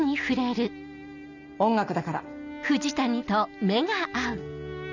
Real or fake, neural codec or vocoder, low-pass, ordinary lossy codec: real; none; 7.2 kHz; none